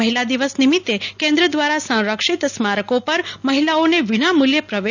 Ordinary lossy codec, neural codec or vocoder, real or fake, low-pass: none; none; real; 7.2 kHz